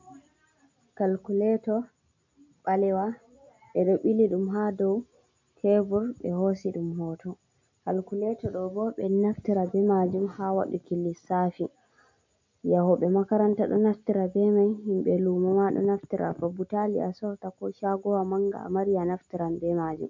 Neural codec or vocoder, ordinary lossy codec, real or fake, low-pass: none; MP3, 48 kbps; real; 7.2 kHz